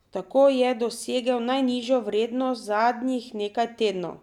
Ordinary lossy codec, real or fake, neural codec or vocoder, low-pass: none; real; none; 19.8 kHz